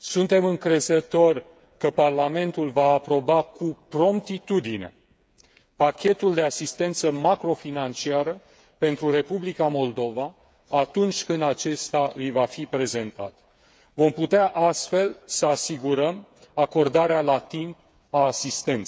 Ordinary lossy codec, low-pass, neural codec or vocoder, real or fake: none; none; codec, 16 kHz, 8 kbps, FreqCodec, smaller model; fake